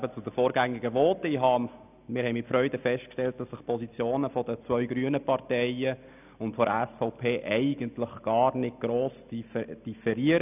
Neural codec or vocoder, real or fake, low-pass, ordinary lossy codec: none; real; 3.6 kHz; none